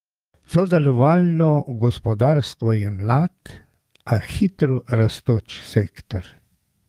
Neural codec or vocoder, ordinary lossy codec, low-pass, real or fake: codec, 32 kHz, 1.9 kbps, SNAC; Opus, 32 kbps; 14.4 kHz; fake